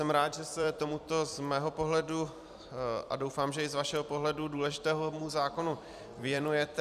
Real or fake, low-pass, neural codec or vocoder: fake; 14.4 kHz; vocoder, 44.1 kHz, 128 mel bands every 256 samples, BigVGAN v2